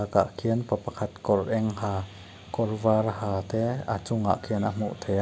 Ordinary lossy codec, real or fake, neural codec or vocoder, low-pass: none; real; none; none